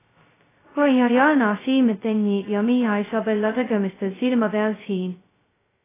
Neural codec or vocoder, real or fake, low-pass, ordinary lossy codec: codec, 16 kHz, 0.2 kbps, FocalCodec; fake; 3.6 kHz; AAC, 16 kbps